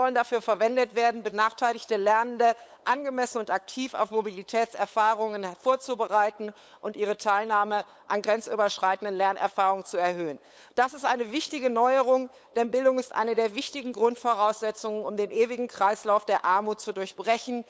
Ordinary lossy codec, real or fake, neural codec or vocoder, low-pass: none; fake; codec, 16 kHz, 8 kbps, FunCodec, trained on LibriTTS, 25 frames a second; none